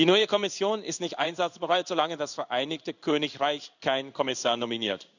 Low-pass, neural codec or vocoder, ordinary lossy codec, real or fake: 7.2 kHz; codec, 16 kHz in and 24 kHz out, 1 kbps, XY-Tokenizer; none; fake